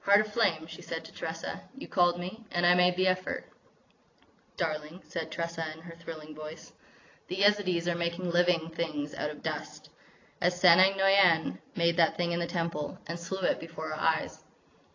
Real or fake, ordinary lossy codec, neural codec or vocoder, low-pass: real; AAC, 32 kbps; none; 7.2 kHz